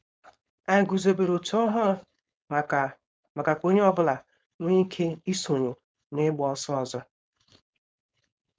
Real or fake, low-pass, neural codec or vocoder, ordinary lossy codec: fake; none; codec, 16 kHz, 4.8 kbps, FACodec; none